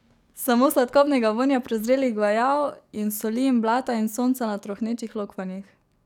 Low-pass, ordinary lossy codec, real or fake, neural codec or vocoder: 19.8 kHz; none; fake; codec, 44.1 kHz, 7.8 kbps, DAC